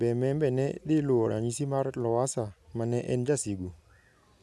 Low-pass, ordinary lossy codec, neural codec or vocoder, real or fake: none; none; none; real